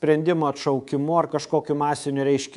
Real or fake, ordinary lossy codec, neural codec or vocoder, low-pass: fake; MP3, 96 kbps; codec, 24 kHz, 3.1 kbps, DualCodec; 10.8 kHz